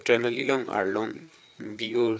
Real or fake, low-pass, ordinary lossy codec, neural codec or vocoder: fake; none; none; codec, 16 kHz, 4 kbps, FreqCodec, larger model